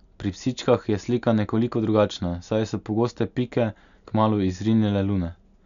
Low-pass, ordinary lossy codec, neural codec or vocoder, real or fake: 7.2 kHz; none; none; real